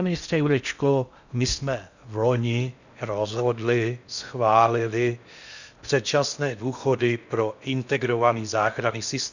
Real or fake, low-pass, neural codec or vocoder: fake; 7.2 kHz; codec, 16 kHz in and 24 kHz out, 0.6 kbps, FocalCodec, streaming, 4096 codes